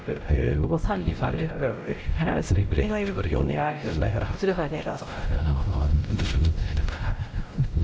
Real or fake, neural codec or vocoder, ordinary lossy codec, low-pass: fake; codec, 16 kHz, 0.5 kbps, X-Codec, WavLM features, trained on Multilingual LibriSpeech; none; none